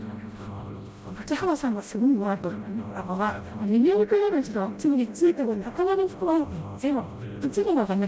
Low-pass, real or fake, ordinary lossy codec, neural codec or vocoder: none; fake; none; codec, 16 kHz, 0.5 kbps, FreqCodec, smaller model